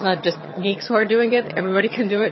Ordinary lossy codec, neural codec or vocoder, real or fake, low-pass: MP3, 24 kbps; vocoder, 22.05 kHz, 80 mel bands, HiFi-GAN; fake; 7.2 kHz